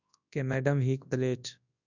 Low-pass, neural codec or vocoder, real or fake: 7.2 kHz; codec, 24 kHz, 0.9 kbps, WavTokenizer, large speech release; fake